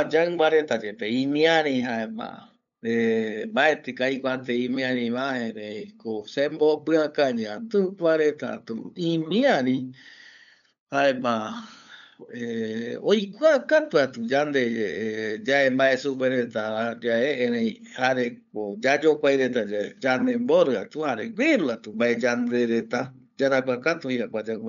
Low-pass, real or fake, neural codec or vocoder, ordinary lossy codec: 7.2 kHz; fake; codec, 16 kHz, 8 kbps, FunCodec, trained on LibriTTS, 25 frames a second; none